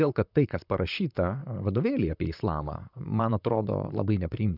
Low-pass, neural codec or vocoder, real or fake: 5.4 kHz; vocoder, 44.1 kHz, 128 mel bands, Pupu-Vocoder; fake